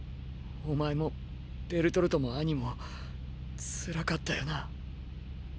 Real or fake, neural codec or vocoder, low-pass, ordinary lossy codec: real; none; none; none